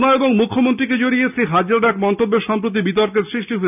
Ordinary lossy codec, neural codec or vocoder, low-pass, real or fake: none; none; 3.6 kHz; real